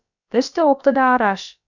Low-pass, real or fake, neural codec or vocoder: 7.2 kHz; fake; codec, 16 kHz, about 1 kbps, DyCAST, with the encoder's durations